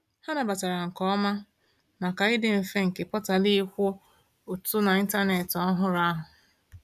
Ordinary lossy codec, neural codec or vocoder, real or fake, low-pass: none; none; real; 14.4 kHz